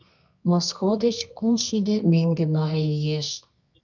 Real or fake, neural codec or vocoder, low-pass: fake; codec, 24 kHz, 0.9 kbps, WavTokenizer, medium music audio release; 7.2 kHz